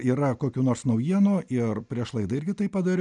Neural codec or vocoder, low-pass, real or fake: none; 10.8 kHz; real